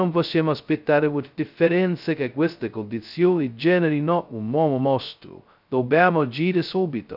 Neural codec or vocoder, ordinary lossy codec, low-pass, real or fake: codec, 16 kHz, 0.2 kbps, FocalCodec; none; 5.4 kHz; fake